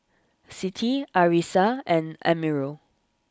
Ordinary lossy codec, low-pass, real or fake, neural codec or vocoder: none; none; real; none